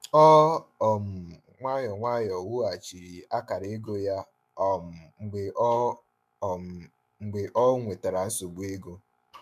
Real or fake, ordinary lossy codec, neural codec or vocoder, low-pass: fake; MP3, 96 kbps; autoencoder, 48 kHz, 128 numbers a frame, DAC-VAE, trained on Japanese speech; 14.4 kHz